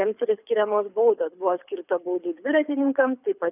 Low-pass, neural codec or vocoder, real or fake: 3.6 kHz; codec, 24 kHz, 6 kbps, HILCodec; fake